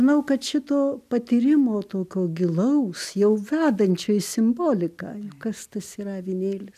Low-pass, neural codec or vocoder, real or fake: 14.4 kHz; none; real